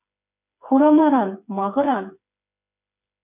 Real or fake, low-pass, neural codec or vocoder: fake; 3.6 kHz; codec, 16 kHz, 4 kbps, FreqCodec, smaller model